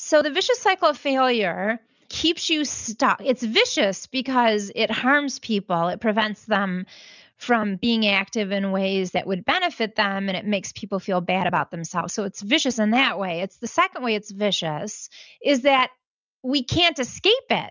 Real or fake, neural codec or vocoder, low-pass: real; none; 7.2 kHz